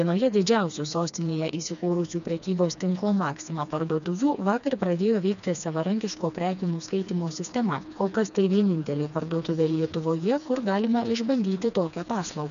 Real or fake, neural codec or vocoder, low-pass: fake; codec, 16 kHz, 2 kbps, FreqCodec, smaller model; 7.2 kHz